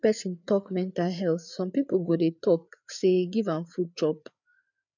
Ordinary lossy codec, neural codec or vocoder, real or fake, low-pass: none; codec, 16 kHz, 4 kbps, FreqCodec, larger model; fake; 7.2 kHz